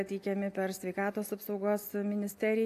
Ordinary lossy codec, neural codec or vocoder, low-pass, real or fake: AAC, 64 kbps; none; 14.4 kHz; real